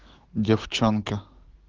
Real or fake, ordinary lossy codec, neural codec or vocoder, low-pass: fake; Opus, 16 kbps; codec, 16 kHz, 6 kbps, DAC; 7.2 kHz